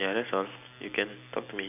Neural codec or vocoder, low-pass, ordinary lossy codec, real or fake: none; 3.6 kHz; none; real